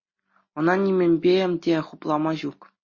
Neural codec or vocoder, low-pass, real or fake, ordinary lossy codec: none; 7.2 kHz; real; MP3, 32 kbps